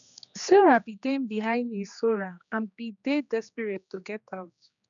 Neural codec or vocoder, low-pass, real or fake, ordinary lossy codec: codec, 16 kHz, 2 kbps, X-Codec, HuBERT features, trained on general audio; 7.2 kHz; fake; none